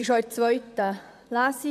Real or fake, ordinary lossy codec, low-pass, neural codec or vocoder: fake; none; 14.4 kHz; vocoder, 44.1 kHz, 128 mel bands, Pupu-Vocoder